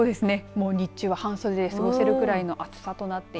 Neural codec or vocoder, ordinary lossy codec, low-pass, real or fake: none; none; none; real